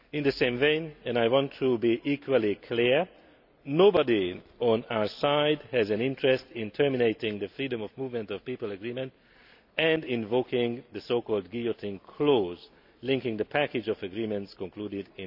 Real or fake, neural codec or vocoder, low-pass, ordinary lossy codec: real; none; 5.4 kHz; none